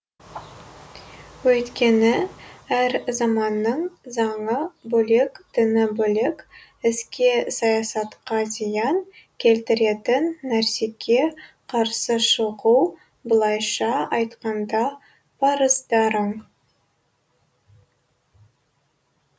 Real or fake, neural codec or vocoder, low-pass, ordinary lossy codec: real; none; none; none